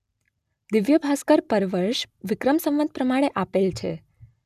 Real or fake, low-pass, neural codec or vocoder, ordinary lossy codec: real; 14.4 kHz; none; none